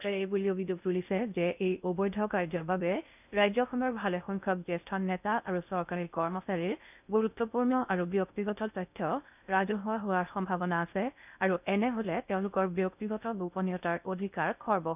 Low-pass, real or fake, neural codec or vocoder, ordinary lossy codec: 3.6 kHz; fake; codec, 16 kHz in and 24 kHz out, 0.6 kbps, FocalCodec, streaming, 2048 codes; none